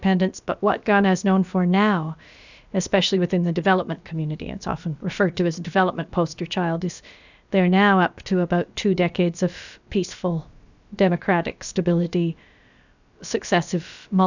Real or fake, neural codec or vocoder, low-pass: fake; codec, 16 kHz, about 1 kbps, DyCAST, with the encoder's durations; 7.2 kHz